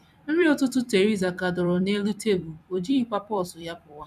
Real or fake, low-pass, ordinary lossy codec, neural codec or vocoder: fake; 14.4 kHz; none; vocoder, 44.1 kHz, 128 mel bands every 256 samples, BigVGAN v2